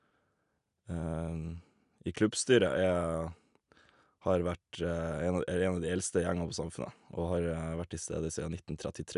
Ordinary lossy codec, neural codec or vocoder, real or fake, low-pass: none; none; real; 10.8 kHz